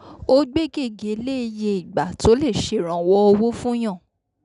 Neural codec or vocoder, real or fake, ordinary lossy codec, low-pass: none; real; none; 10.8 kHz